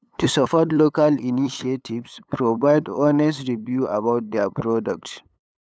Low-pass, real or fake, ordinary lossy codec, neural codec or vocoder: none; fake; none; codec, 16 kHz, 8 kbps, FunCodec, trained on LibriTTS, 25 frames a second